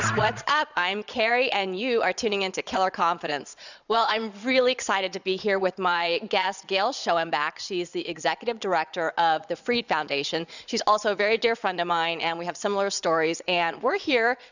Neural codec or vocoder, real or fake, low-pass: vocoder, 22.05 kHz, 80 mel bands, Vocos; fake; 7.2 kHz